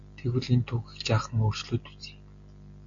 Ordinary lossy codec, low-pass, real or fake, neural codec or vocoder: MP3, 48 kbps; 7.2 kHz; real; none